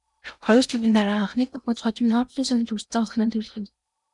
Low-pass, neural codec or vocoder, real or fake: 10.8 kHz; codec, 16 kHz in and 24 kHz out, 0.8 kbps, FocalCodec, streaming, 65536 codes; fake